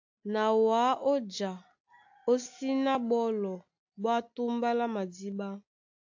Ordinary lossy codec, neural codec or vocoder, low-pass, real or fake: AAC, 48 kbps; none; 7.2 kHz; real